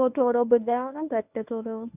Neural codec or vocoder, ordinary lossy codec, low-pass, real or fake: codec, 24 kHz, 0.9 kbps, WavTokenizer, medium speech release version 1; none; 3.6 kHz; fake